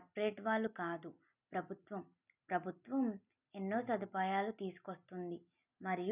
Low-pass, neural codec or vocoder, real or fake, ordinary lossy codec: 3.6 kHz; none; real; none